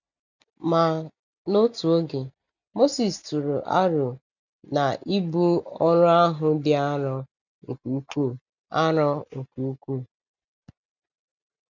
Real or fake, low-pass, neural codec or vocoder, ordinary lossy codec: real; 7.2 kHz; none; none